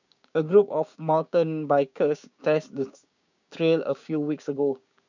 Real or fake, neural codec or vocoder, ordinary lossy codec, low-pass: fake; codec, 16 kHz, 6 kbps, DAC; none; 7.2 kHz